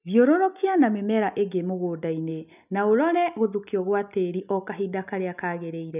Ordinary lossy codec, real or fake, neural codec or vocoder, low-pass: none; real; none; 3.6 kHz